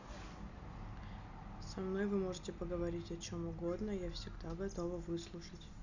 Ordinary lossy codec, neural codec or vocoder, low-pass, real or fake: none; none; 7.2 kHz; real